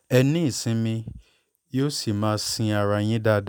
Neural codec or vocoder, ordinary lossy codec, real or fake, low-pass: none; none; real; none